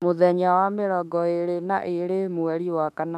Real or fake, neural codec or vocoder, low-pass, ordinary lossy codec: fake; autoencoder, 48 kHz, 32 numbers a frame, DAC-VAE, trained on Japanese speech; 14.4 kHz; none